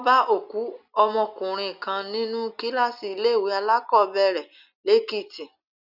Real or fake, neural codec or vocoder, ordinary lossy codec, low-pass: real; none; none; 5.4 kHz